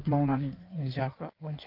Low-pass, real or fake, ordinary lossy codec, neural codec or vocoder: 5.4 kHz; fake; Opus, 24 kbps; codec, 16 kHz in and 24 kHz out, 1.1 kbps, FireRedTTS-2 codec